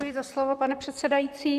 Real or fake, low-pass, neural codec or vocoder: fake; 14.4 kHz; vocoder, 44.1 kHz, 128 mel bands, Pupu-Vocoder